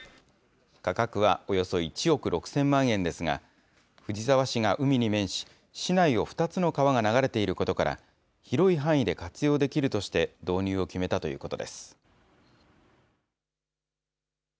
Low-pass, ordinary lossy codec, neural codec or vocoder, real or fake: none; none; none; real